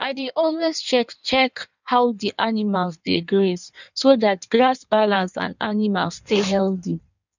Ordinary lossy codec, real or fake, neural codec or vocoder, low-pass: none; fake; codec, 16 kHz in and 24 kHz out, 1.1 kbps, FireRedTTS-2 codec; 7.2 kHz